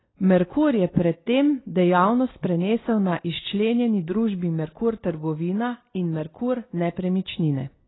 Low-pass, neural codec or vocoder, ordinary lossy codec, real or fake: 7.2 kHz; none; AAC, 16 kbps; real